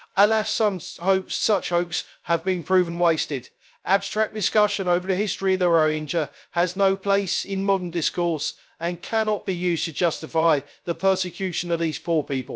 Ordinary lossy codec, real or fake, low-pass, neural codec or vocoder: none; fake; none; codec, 16 kHz, 0.3 kbps, FocalCodec